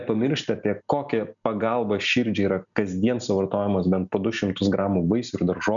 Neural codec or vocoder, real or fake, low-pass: none; real; 7.2 kHz